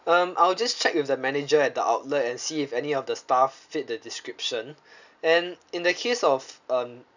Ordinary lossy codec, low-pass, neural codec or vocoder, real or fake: none; 7.2 kHz; none; real